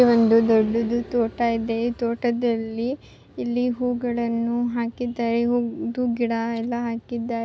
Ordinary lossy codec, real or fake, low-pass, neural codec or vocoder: none; real; none; none